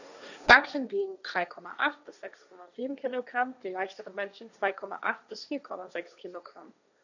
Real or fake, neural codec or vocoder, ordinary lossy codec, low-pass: fake; codec, 16 kHz, 1.1 kbps, Voila-Tokenizer; none; 7.2 kHz